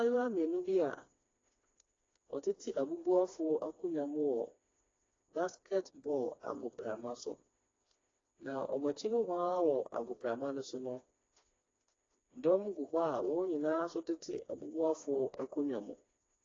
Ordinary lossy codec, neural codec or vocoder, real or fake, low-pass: AAC, 32 kbps; codec, 16 kHz, 2 kbps, FreqCodec, smaller model; fake; 7.2 kHz